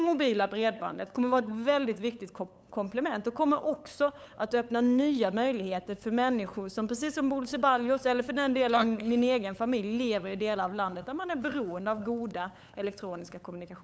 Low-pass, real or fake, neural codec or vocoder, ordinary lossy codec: none; fake; codec, 16 kHz, 8 kbps, FunCodec, trained on LibriTTS, 25 frames a second; none